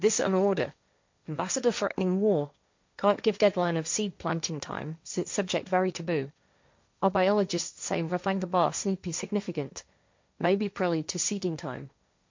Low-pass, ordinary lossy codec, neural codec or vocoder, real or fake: 7.2 kHz; MP3, 64 kbps; codec, 16 kHz, 1.1 kbps, Voila-Tokenizer; fake